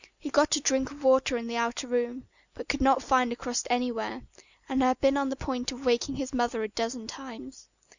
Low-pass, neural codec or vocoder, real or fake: 7.2 kHz; none; real